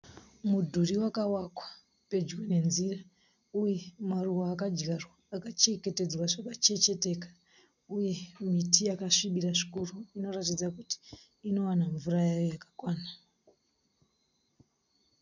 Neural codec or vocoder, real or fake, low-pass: none; real; 7.2 kHz